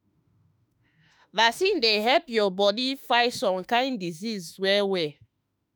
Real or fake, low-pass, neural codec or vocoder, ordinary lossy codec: fake; none; autoencoder, 48 kHz, 32 numbers a frame, DAC-VAE, trained on Japanese speech; none